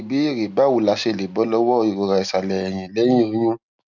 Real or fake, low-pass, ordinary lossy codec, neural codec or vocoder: real; 7.2 kHz; none; none